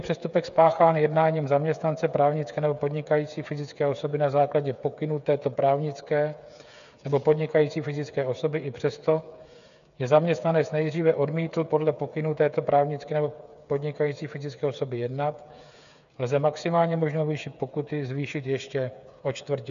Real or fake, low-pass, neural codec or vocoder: fake; 7.2 kHz; codec, 16 kHz, 8 kbps, FreqCodec, smaller model